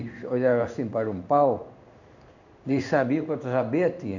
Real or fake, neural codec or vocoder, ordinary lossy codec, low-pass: fake; codec, 16 kHz in and 24 kHz out, 1 kbps, XY-Tokenizer; none; 7.2 kHz